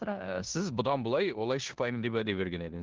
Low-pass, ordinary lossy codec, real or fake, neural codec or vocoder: 7.2 kHz; Opus, 16 kbps; fake; codec, 16 kHz in and 24 kHz out, 0.9 kbps, LongCat-Audio-Codec, four codebook decoder